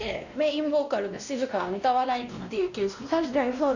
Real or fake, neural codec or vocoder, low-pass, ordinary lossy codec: fake; codec, 16 kHz, 1 kbps, X-Codec, WavLM features, trained on Multilingual LibriSpeech; 7.2 kHz; none